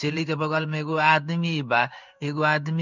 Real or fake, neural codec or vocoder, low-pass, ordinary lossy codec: fake; codec, 16 kHz in and 24 kHz out, 1 kbps, XY-Tokenizer; 7.2 kHz; none